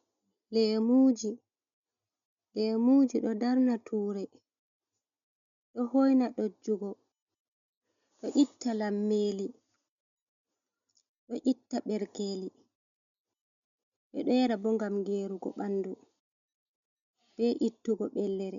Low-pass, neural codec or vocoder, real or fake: 7.2 kHz; none; real